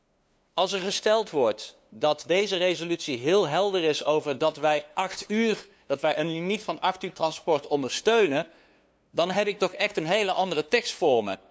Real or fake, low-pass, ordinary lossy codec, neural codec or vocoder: fake; none; none; codec, 16 kHz, 2 kbps, FunCodec, trained on LibriTTS, 25 frames a second